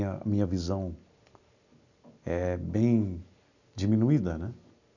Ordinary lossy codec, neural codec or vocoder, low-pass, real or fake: none; none; 7.2 kHz; real